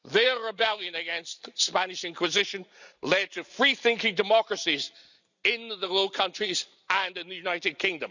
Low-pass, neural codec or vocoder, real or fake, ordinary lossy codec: 7.2 kHz; none; real; none